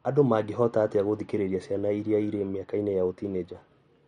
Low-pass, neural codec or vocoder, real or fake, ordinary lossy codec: 19.8 kHz; none; real; MP3, 48 kbps